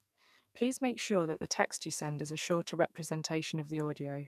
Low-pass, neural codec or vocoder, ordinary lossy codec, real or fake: 14.4 kHz; codec, 44.1 kHz, 2.6 kbps, SNAC; none; fake